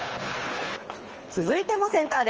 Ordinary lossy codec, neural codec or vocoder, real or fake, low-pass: Opus, 24 kbps; codec, 24 kHz, 3 kbps, HILCodec; fake; 7.2 kHz